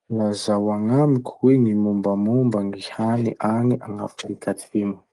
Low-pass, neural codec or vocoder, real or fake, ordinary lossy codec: 10.8 kHz; none; real; Opus, 24 kbps